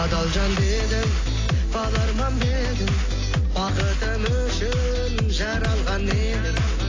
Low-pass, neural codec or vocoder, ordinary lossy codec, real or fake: 7.2 kHz; none; AAC, 32 kbps; real